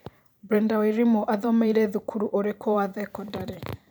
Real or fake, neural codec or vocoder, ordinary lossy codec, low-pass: fake; vocoder, 44.1 kHz, 128 mel bands every 512 samples, BigVGAN v2; none; none